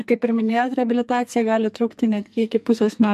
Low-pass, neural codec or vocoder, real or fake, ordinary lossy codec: 14.4 kHz; codec, 44.1 kHz, 2.6 kbps, SNAC; fake; MP3, 64 kbps